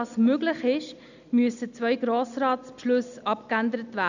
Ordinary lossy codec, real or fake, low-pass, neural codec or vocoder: none; real; 7.2 kHz; none